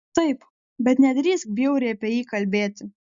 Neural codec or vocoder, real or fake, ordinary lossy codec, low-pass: none; real; Opus, 64 kbps; 7.2 kHz